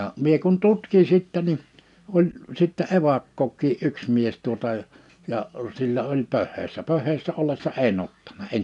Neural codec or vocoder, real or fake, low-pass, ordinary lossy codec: none; real; 10.8 kHz; none